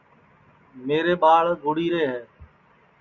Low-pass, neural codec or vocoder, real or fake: 7.2 kHz; none; real